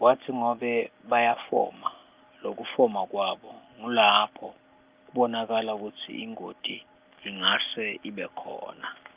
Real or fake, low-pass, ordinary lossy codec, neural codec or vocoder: real; 3.6 kHz; Opus, 32 kbps; none